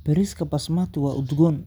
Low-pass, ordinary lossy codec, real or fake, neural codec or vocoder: none; none; real; none